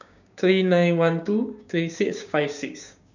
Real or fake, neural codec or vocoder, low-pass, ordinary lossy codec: fake; codec, 16 kHz, 6 kbps, DAC; 7.2 kHz; none